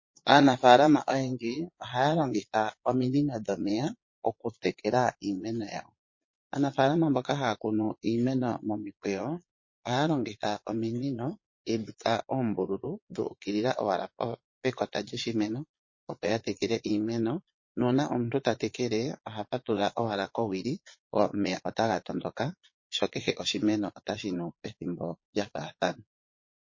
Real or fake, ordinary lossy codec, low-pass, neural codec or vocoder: fake; MP3, 32 kbps; 7.2 kHz; vocoder, 22.05 kHz, 80 mel bands, Vocos